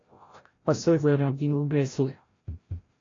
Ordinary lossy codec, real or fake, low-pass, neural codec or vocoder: AAC, 32 kbps; fake; 7.2 kHz; codec, 16 kHz, 0.5 kbps, FreqCodec, larger model